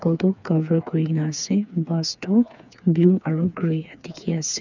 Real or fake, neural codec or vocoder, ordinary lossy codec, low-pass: fake; codec, 16 kHz, 4 kbps, FreqCodec, smaller model; none; 7.2 kHz